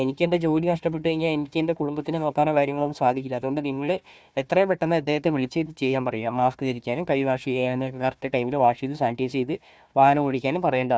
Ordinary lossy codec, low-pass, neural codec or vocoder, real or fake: none; none; codec, 16 kHz, 1 kbps, FunCodec, trained on Chinese and English, 50 frames a second; fake